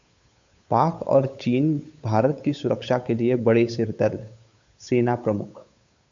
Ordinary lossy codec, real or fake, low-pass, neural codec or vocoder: AAC, 64 kbps; fake; 7.2 kHz; codec, 16 kHz, 8 kbps, FunCodec, trained on Chinese and English, 25 frames a second